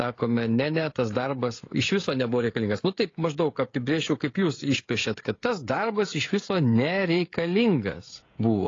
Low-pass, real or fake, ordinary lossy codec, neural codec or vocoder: 7.2 kHz; fake; AAC, 32 kbps; codec, 16 kHz, 16 kbps, FreqCodec, smaller model